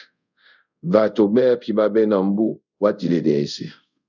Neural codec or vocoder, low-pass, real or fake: codec, 24 kHz, 0.5 kbps, DualCodec; 7.2 kHz; fake